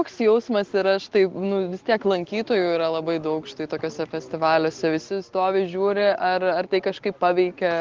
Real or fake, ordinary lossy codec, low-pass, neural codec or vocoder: real; Opus, 16 kbps; 7.2 kHz; none